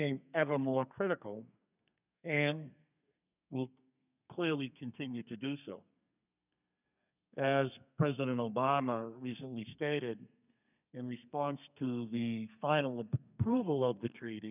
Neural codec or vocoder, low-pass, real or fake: codec, 32 kHz, 1.9 kbps, SNAC; 3.6 kHz; fake